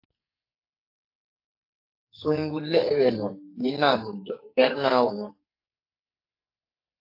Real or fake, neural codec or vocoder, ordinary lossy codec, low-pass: fake; codec, 44.1 kHz, 2.6 kbps, SNAC; AAC, 32 kbps; 5.4 kHz